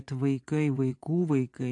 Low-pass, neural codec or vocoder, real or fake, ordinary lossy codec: 10.8 kHz; vocoder, 24 kHz, 100 mel bands, Vocos; fake; AAC, 48 kbps